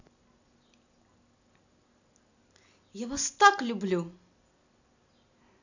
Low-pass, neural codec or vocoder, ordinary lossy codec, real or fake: 7.2 kHz; none; none; real